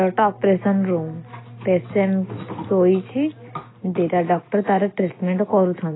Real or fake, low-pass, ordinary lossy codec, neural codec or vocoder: real; 7.2 kHz; AAC, 16 kbps; none